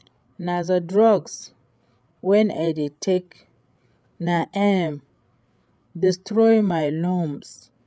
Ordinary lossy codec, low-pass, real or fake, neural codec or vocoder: none; none; fake; codec, 16 kHz, 8 kbps, FreqCodec, larger model